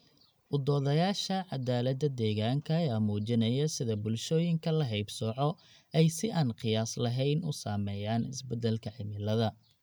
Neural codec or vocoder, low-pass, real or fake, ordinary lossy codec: none; none; real; none